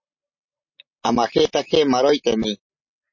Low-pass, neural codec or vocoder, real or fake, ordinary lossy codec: 7.2 kHz; none; real; MP3, 32 kbps